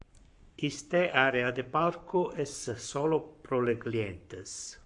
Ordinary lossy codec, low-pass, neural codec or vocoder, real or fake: AAC, 64 kbps; 10.8 kHz; codec, 44.1 kHz, 7.8 kbps, Pupu-Codec; fake